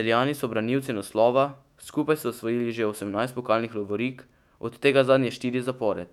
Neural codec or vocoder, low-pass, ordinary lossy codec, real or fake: autoencoder, 48 kHz, 128 numbers a frame, DAC-VAE, trained on Japanese speech; 19.8 kHz; none; fake